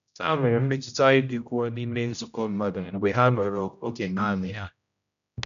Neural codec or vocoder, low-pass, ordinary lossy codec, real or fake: codec, 16 kHz, 0.5 kbps, X-Codec, HuBERT features, trained on general audio; 7.2 kHz; none; fake